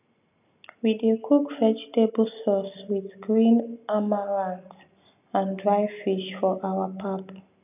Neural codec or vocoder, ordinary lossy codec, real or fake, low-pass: none; none; real; 3.6 kHz